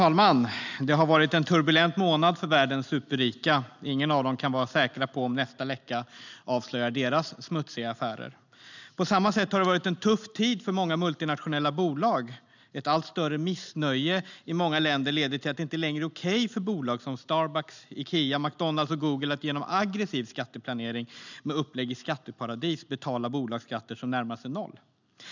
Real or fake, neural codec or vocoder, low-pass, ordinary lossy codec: real; none; 7.2 kHz; none